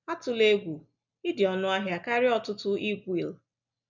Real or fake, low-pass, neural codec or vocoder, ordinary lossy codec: real; 7.2 kHz; none; none